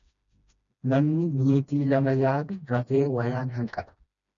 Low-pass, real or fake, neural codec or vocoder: 7.2 kHz; fake; codec, 16 kHz, 1 kbps, FreqCodec, smaller model